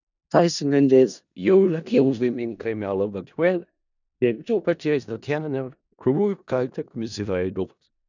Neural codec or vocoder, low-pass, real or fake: codec, 16 kHz in and 24 kHz out, 0.4 kbps, LongCat-Audio-Codec, four codebook decoder; 7.2 kHz; fake